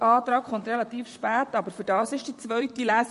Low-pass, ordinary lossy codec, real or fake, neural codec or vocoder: 14.4 kHz; MP3, 48 kbps; fake; vocoder, 44.1 kHz, 128 mel bands, Pupu-Vocoder